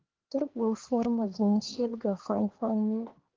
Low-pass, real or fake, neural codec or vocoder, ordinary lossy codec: 7.2 kHz; fake; codec, 16 kHz, 2 kbps, X-Codec, HuBERT features, trained on LibriSpeech; Opus, 16 kbps